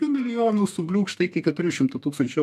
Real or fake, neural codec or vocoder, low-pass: fake; codec, 32 kHz, 1.9 kbps, SNAC; 14.4 kHz